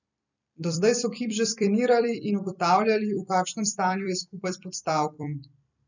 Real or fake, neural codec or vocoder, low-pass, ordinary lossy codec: fake; vocoder, 44.1 kHz, 128 mel bands every 256 samples, BigVGAN v2; 7.2 kHz; none